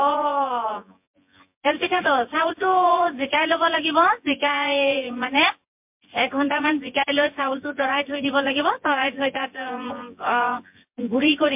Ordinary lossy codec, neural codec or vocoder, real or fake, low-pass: MP3, 32 kbps; vocoder, 24 kHz, 100 mel bands, Vocos; fake; 3.6 kHz